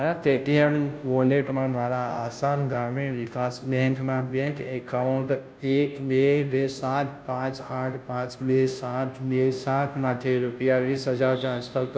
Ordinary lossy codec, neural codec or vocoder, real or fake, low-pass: none; codec, 16 kHz, 0.5 kbps, FunCodec, trained on Chinese and English, 25 frames a second; fake; none